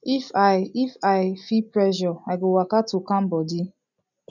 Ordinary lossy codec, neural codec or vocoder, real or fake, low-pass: none; none; real; 7.2 kHz